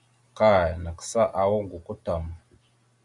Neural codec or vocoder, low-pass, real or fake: none; 10.8 kHz; real